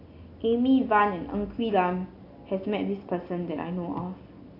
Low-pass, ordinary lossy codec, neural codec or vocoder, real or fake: 5.4 kHz; AAC, 32 kbps; none; real